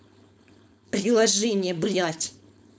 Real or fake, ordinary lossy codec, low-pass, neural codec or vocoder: fake; none; none; codec, 16 kHz, 4.8 kbps, FACodec